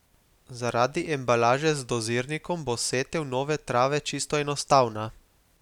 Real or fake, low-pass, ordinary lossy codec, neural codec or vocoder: real; 19.8 kHz; none; none